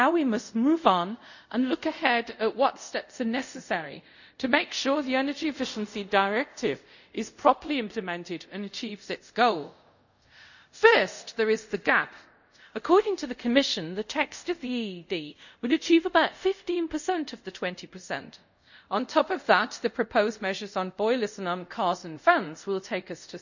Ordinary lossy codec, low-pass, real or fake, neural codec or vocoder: none; 7.2 kHz; fake; codec, 24 kHz, 0.5 kbps, DualCodec